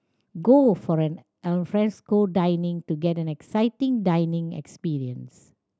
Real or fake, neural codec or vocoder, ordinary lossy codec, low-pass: real; none; none; none